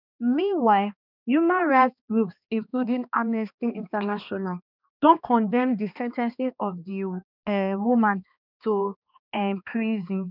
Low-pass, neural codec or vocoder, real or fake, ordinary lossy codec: 5.4 kHz; codec, 16 kHz, 2 kbps, X-Codec, HuBERT features, trained on balanced general audio; fake; none